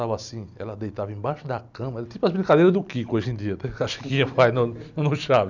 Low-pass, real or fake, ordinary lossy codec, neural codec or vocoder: 7.2 kHz; real; none; none